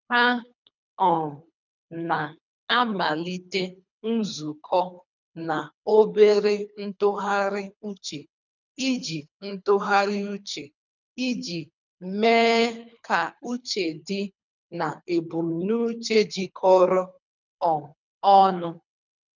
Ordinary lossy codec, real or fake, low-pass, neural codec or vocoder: none; fake; 7.2 kHz; codec, 24 kHz, 3 kbps, HILCodec